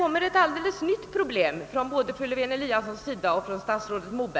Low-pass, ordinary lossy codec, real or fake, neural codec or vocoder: none; none; real; none